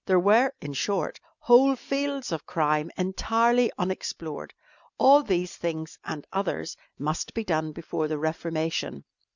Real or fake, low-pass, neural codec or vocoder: real; 7.2 kHz; none